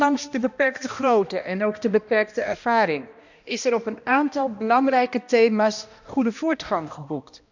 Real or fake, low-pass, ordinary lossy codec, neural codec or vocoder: fake; 7.2 kHz; none; codec, 16 kHz, 1 kbps, X-Codec, HuBERT features, trained on balanced general audio